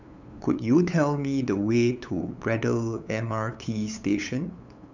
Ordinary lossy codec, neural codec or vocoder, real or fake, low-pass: none; codec, 16 kHz, 8 kbps, FunCodec, trained on LibriTTS, 25 frames a second; fake; 7.2 kHz